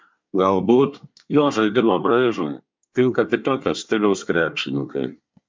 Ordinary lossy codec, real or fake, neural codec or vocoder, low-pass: MP3, 64 kbps; fake; codec, 24 kHz, 1 kbps, SNAC; 7.2 kHz